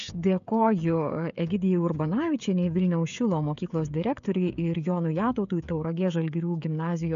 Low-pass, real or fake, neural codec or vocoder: 7.2 kHz; fake; codec, 16 kHz, 16 kbps, FreqCodec, smaller model